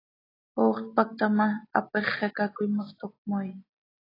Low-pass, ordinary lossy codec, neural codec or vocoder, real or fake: 5.4 kHz; AAC, 24 kbps; none; real